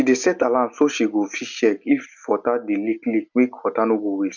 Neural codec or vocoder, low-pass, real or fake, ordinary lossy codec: none; 7.2 kHz; real; none